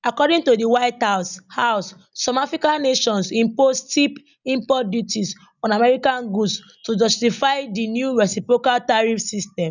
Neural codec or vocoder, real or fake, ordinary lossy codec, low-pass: none; real; none; 7.2 kHz